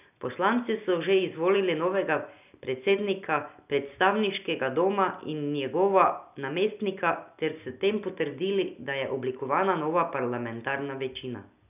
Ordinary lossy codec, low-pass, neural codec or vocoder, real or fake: none; 3.6 kHz; none; real